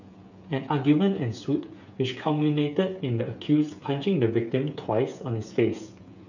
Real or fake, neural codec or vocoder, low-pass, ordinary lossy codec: fake; codec, 16 kHz, 8 kbps, FreqCodec, smaller model; 7.2 kHz; none